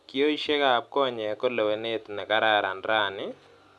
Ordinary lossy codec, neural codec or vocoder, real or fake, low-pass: none; none; real; none